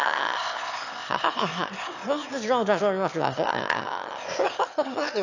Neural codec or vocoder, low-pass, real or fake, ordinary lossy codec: autoencoder, 22.05 kHz, a latent of 192 numbers a frame, VITS, trained on one speaker; 7.2 kHz; fake; AAC, 48 kbps